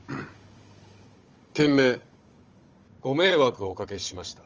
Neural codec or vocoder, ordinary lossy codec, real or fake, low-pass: codec, 16 kHz, 16 kbps, FunCodec, trained on Chinese and English, 50 frames a second; Opus, 24 kbps; fake; 7.2 kHz